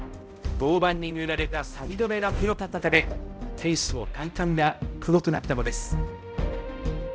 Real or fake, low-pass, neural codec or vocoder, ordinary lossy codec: fake; none; codec, 16 kHz, 0.5 kbps, X-Codec, HuBERT features, trained on balanced general audio; none